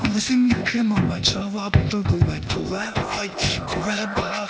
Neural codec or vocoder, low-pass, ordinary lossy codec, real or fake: codec, 16 kHz, 0.8 kbps, ZipCodec; none; none; fake